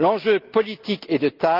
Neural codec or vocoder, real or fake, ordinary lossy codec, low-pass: none; real; Opus, 24 kbps; 5.4 kHz